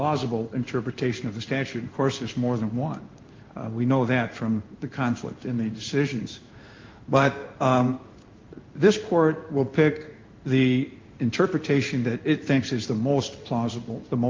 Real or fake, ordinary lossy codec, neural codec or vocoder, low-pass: fake; Opus, 24 kbps; codec, 16 kHz in and 24 kHz out, 1 kbps, XY-Tokenizer; 7.2 kHz